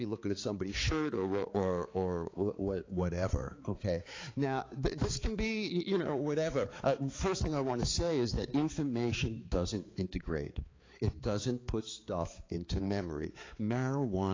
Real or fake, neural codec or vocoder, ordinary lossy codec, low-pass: fake; codec, 16 kHz, 4 kbps, X-Codec, HuBERT features, trained on balanced general audio; AAC, 32 kbps; 7.2 kHz